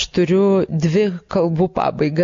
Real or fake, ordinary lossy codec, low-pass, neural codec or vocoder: real; MP3, 48 kbps; 7.2 kHz; none